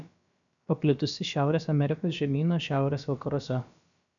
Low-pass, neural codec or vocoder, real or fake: 7.2 kHz; codec, 16 kHz, about 1 kbps, DyCAST, with the encoder's durations; fake